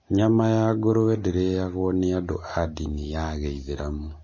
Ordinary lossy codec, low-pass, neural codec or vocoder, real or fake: MP3, 32 kbps; 7.2 kHz; none; real